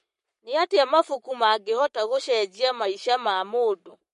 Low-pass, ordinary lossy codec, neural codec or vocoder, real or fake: 14.4 kHz; MP3, 48 kbps; codec, 44.1 kHz, 7.8 kbps, Pupu-Codec; fake